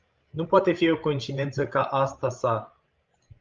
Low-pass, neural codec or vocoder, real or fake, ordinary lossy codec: 7.2 kHz; codec, 16 kHz, 16 kbps, FreqCodec, larger model; fake; Opus, 24 kbps